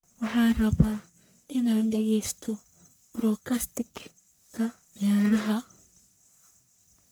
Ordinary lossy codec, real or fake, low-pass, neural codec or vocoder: none; fake; none; codec, 44.1 kHz, 1.7 kbps, Pupu-Codec